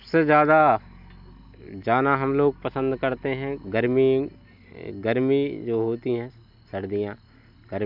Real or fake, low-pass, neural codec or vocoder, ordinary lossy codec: real; 5.4 kHz; none; none